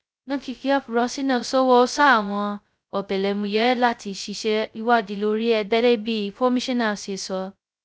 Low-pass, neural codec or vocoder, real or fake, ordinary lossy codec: none; codec, 16 kHz, 0.2 kbps, FocalCodec; fake; none